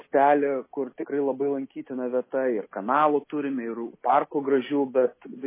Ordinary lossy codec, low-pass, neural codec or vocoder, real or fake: MP3, 16 kbps; 3.6 kHz; none; real